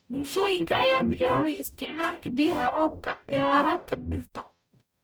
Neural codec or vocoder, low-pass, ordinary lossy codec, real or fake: codec, 44.1 kHz, 0.9 kbps, DAC; none; none; fake